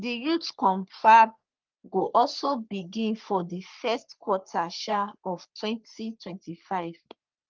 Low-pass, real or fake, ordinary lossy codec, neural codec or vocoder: 7.2 kHz; fake; Opus, 32 kbps; codec, 44.1 kHz, 3.4 kbps, Pupu-Codec